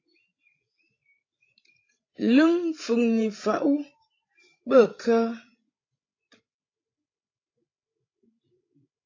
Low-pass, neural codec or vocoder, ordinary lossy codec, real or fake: 7.2 kHz; codec, 16 kHz, 16 kbps, FreqCodec, larger model; AAC, 32 kbps; fake